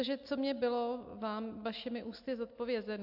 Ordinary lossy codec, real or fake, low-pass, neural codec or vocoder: AAC, 48 kbps; real; 5.4 kHz; none